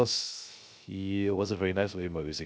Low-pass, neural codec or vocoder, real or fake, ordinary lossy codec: none; codec, 16 kHz, 0.3 kbps, FocalCodec; fake; none